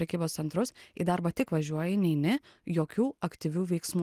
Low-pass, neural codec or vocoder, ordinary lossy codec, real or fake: 14.4 kHz; none; Opus, 24 kbps; real